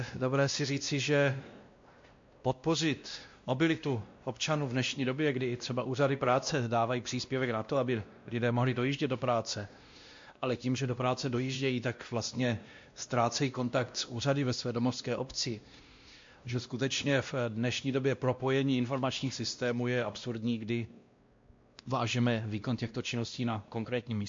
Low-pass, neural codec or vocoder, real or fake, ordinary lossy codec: 7.2 kHz; codec, 16 kHz, 1 kbps, X-Codec, WavLM features, trained on Multilingual LibriSpeech; fake; MP3, 48 kbps